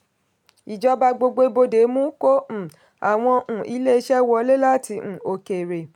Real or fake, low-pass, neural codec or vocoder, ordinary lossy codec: real; 19.8 kHz; none; none